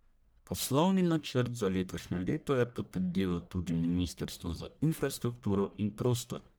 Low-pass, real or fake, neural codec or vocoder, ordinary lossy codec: none; fake; codec, 44.1 kHz, 1.7 kbps, Pupu-Codec; none